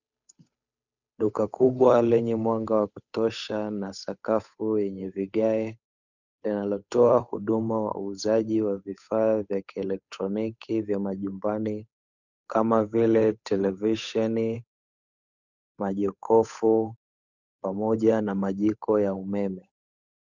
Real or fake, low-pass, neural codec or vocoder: fake; 7.2 kHz; codec, 16 kHz, 8 kbps, FunCodec, trained on Chinese and English, 25 frames a second